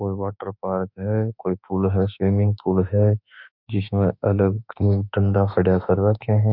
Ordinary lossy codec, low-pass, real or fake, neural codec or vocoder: none; 5.4 kHz; fake; autoencoder, 48 kHz, 32 numbers a frame, DAC-VAE, trained on Japanese speech